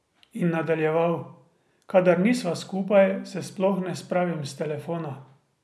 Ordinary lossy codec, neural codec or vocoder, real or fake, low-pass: none; none; real; none